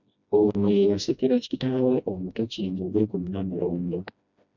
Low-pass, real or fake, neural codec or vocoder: 7.2 kHz; fake; codec, 16 kHz, 1 kbps, FreqCodec, smaller model